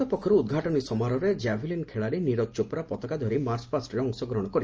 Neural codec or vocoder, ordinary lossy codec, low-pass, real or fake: none; Opus, 24 kbps; 7.2 kHz; real